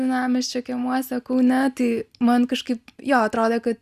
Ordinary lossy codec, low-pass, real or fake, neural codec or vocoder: Opus, 64 kbps; 14.4 kHz; real; none